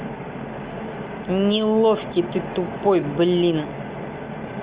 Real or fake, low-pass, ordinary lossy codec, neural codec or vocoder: real; 3.6 kHz; Opus, 24 kbps; none